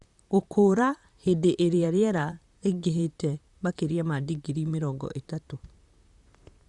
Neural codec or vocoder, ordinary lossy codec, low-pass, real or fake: vocoder, 44.1 kHz, 128 mel bands, Pupu-Vocoder; Opus, 64 kbps; 10.8 kHz; fake